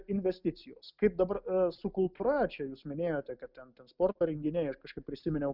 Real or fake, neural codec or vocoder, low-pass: real; none; 5.4 kHz